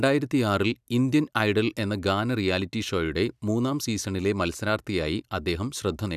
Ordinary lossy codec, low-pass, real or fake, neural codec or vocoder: none; 14.4 kHz; real; none